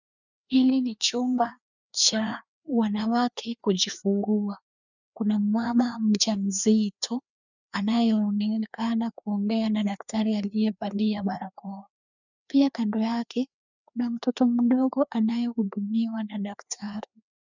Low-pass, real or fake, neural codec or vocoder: 7.2 kHz; fake; codec, 16 kHz, 2 kbps, FreqCodec, larger model